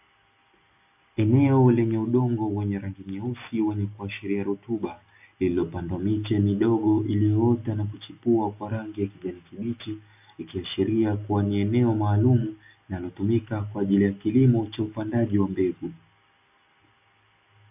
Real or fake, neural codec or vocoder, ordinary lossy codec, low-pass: real; none; AAC, 32 kbps; 3.6 kHz